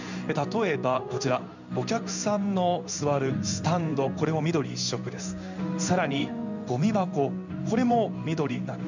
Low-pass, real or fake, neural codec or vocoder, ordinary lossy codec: 7.2 kHz; fake; codec, 16 kHz in and 24 kHz out, 1 kbps, XY-Tokenizer; none